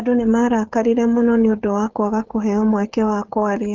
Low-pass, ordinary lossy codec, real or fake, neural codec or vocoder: 7.2 kHz; Opus, 16 kbps; fake; codec, 16 kHz, 16 kbps, FreqCodec, smaller model